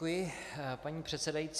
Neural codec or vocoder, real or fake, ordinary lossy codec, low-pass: none; real; AAC, 96 kbps; 14.4 kHz